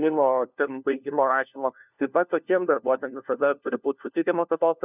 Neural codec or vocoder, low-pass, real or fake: codec, 16 kHz, 1 kbps, FunCodec, trained on LibriTTS, 50 frames a second; 3.6 kHz; fake